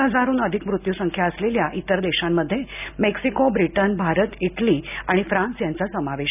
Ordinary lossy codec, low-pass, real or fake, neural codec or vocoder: none; 3.6 kHz; real; none